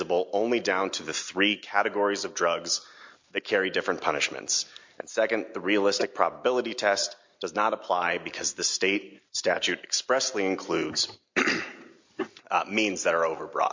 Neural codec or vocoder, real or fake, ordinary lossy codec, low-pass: none; real; MP3, 48 kbps; 7.2 kHz